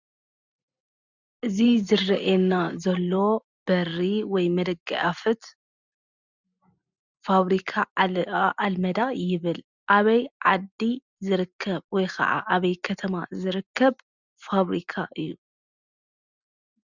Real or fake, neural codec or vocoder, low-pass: real; none; 7.2 kHz